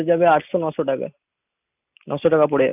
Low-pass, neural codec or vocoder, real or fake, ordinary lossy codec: 3.6 kHz; none; real; none